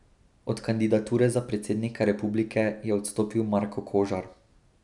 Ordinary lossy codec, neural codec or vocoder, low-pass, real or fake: none; none; 10.8 kHz; real